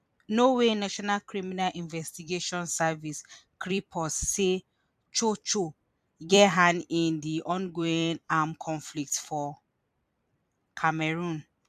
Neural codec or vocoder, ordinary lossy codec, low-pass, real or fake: vocoder, 44.1 kHz, 128 mel bands every 256 samples, BigVGAN v2; MP3, 96 kbps; 14.4 kHz; fake